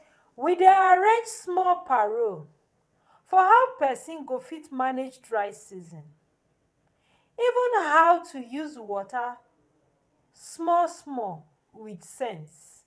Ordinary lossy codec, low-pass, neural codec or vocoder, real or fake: none; none; vocoder, 22.05 kHz, 80 mel bands, WaveNeXt; fake